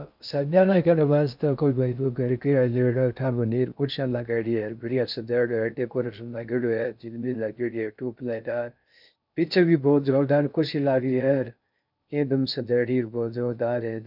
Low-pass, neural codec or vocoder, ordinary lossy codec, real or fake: 5.4 kHz; codec, 16 kHz in and 24 kHz out, 0.6 kbps, FocalCodec, streaming, 2048 codes; none; fake